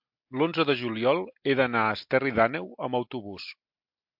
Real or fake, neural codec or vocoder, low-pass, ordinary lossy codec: real; none; 5.4 kHz; MP3, 48 kbps